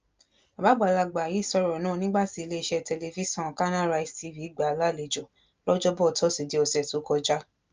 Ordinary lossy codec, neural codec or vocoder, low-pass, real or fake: Opus, 24 kbps; none; 7.2 kHz; real